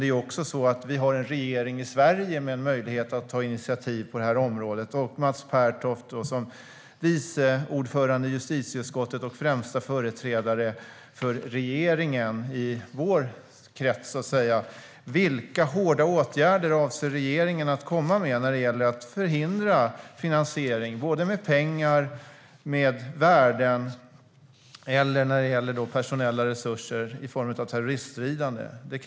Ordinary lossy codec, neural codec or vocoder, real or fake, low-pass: none; none; real; none